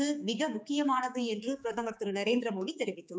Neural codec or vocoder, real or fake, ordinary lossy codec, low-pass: codec, 16 kHz, 4 kbps, X-Codec, HuBERT features, trained on general audio; fake; none; none